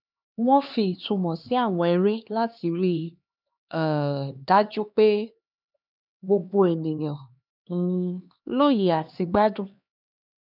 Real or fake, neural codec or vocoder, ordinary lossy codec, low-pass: fake; codec, 16 kHz, 2 kbps, X-Codec, HuBERT features, trained on LibriSpeech; none; 5.4 kHz